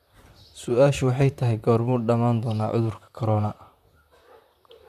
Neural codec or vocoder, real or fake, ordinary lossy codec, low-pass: vocoder, 44.1 kHz, 128 mel bands, Pupu-Vocoder; fake; none; 14.4 kHz